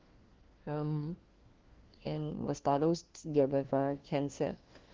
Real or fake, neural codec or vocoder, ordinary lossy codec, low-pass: fake; codec, 16 kHz, 1 kbps, FunCodec, trained on LibriTTS, 50 frames a second; Opus, 16 kbps; 7.2 kHz